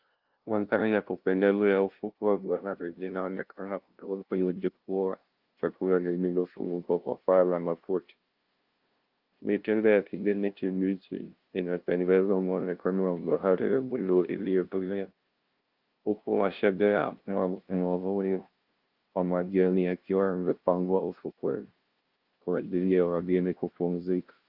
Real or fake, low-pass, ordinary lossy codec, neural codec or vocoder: fake; 5.4 kHz; Opus, 32 kbps; codec, 16 kHz, 0.5 kbps, FunCodec, trained on LibriTTS, 25 frames a second